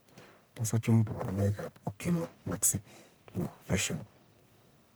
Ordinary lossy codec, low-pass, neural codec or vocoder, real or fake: none; none; codec, 44.1 kHz, 1.7 kbps, Pupu-Codec; fake